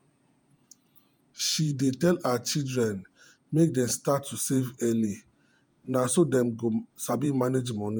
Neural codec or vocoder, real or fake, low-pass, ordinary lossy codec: vocoder, 48 kHz, 128 mel bands, Vocos; fake; none; none